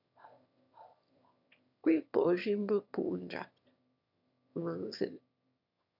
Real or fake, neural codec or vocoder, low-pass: fake; autoencoder, 22.05 kHz, a latent of 192 numbers a frame, VITS, trained on one speaker; 5.4 kHz